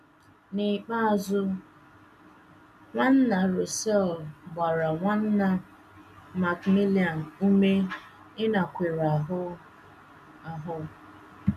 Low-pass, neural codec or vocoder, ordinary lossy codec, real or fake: 14.4 kHz; none; none; real